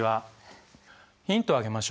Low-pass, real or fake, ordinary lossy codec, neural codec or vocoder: none; real; none; none